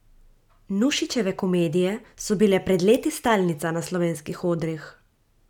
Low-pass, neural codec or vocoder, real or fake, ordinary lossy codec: 19.8 kHz; none; real; none